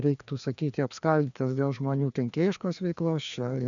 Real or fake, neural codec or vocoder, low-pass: fake; codec, 16 kHz, 2 kbps, FreqCodec, larger model; 7.2 kHz